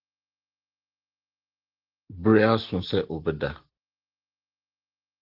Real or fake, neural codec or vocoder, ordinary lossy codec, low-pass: real; none; Opus, 16 kbps; 5.4 kHz